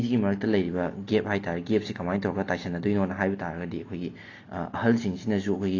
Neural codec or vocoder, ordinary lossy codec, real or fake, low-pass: none; AAC, 32 kbps; real; 7.2 kHz